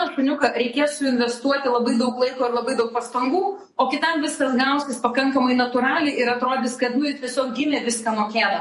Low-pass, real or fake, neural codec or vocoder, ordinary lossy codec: 14.4 kHz; fake; vocoder, 48 kHz, 128 mel bands, Vocos; MP3, 48 kbps